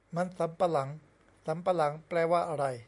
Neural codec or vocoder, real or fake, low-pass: none; real; 10.8 kHz